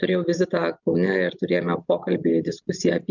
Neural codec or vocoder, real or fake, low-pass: none; real; 7.2 kHz